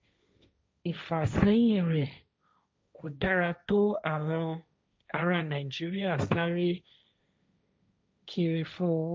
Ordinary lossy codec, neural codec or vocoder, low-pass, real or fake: none; codec, 16 kHz, 1.1 kbps, Voila-Tokenizer; none; fake